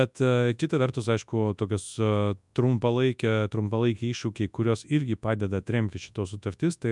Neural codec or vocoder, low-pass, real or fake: codec, 24 kHz, 0.9 kbps, WavTokenizer, large speech release; 10.8 kHz; fake